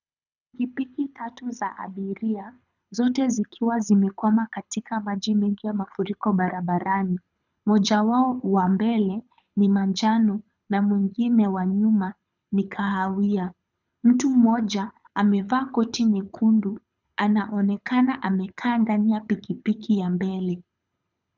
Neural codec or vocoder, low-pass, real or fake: codec, 24 kHz, 6 kbps, HILCodec; 7.2 kHz; fake